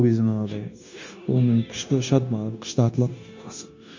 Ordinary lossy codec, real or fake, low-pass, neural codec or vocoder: MP3, 64 kbps; fake; 7.2 kHz; codec, 16 kHz, 0.9 kbps, LongCat-Audio-Codec